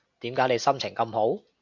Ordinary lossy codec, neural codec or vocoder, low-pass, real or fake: MP3, 64 kbps; none; 7.2 kHz; real